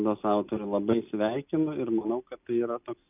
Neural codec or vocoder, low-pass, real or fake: none; 3.6 kHz; real